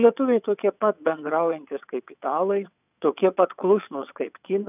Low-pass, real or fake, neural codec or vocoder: 3.6 kHz; fake; vocoder, 22.05 kHz, 80 mel bands, WaveNeXt